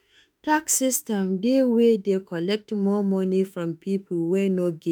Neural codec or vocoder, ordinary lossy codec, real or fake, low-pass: autoencoder, 48 kHz, 32 numbers a frame, DAC-VAE, trained on Japanese speech; none; fake; none